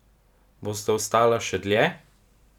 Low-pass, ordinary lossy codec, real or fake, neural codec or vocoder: 19.8 kHz; none; fake; vocoder, 44.1 kHz, 128 mel bands every 512 samples, BigVGAN v2